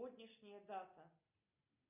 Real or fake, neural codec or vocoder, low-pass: real; none; 3.6 kHz